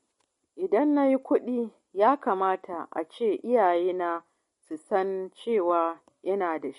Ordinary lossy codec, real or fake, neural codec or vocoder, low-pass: MP3, 48 kbps; real; none; 10.8 kHz